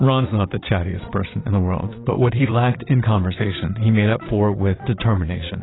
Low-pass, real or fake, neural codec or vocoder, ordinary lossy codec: 7.2 kHz; fake; vocoder, 22.05 kHz, 80 mel bands, Vocos; AAC, 16 kbps